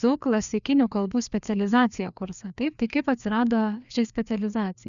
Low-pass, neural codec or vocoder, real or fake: 7.2 kHz; codec, 16 kHz, 2 kbps, FreqCodec, larger model; fake